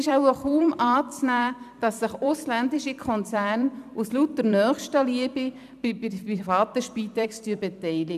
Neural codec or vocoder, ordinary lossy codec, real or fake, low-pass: vocoder, 44.1 kHz, 128 mel bands every 256 samples, BigVGAN v2; none; fake; 14.4 kHz